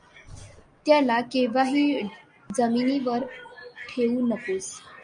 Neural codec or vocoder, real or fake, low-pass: none; real; 9.9 kHz